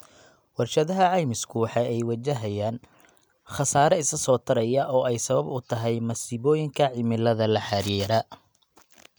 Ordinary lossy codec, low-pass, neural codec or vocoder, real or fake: none; none; none; real